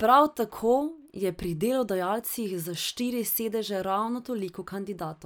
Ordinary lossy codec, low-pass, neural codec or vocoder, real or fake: none; none; none; real